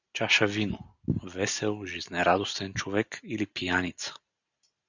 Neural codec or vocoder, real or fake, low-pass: none; real; 7.2 kHz